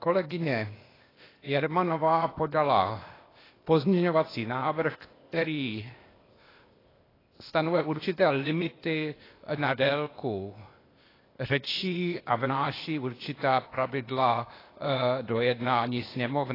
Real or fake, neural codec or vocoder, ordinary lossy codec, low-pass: fake; codec, 16 kHz, 0.8 kbps, ZipCodec; AAC, 24 kbps; 5.4 kHz